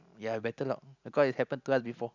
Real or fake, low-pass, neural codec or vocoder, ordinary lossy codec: real; 7.2 kHz; none; none